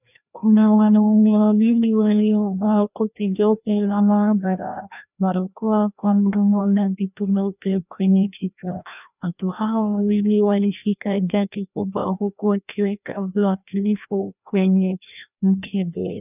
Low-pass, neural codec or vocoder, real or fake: 3.6 kHz; codec, 16 kHz, 1 kbps, FreqCodec, larger model; fake